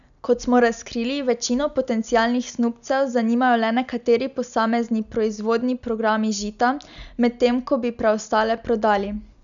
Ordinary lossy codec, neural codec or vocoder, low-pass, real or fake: none; none; 7.2 kHz; real